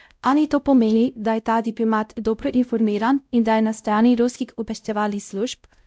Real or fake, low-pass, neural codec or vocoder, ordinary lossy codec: fake; none; codec, 16 kHz, 0.5 kbps, X-Codec, WavLM features, trained on Multilingual LibriSpeech; none